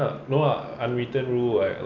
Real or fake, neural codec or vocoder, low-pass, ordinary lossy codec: real; none; 7.2 kHz; none